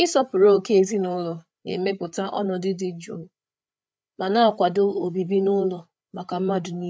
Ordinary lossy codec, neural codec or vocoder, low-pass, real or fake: none; codec, 16 kHz, 8 kbps, FreqCodec, larger model; none; fake